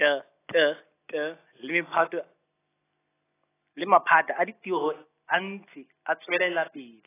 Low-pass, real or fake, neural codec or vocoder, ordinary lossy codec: 3.6 kHz; real; none; AAC, 16 kbps